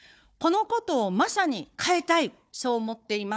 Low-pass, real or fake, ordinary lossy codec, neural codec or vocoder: none; fake; none; codec, 16 kHz, 4 kbps, FunCodec, trained on Chinese and English, 50 frames a second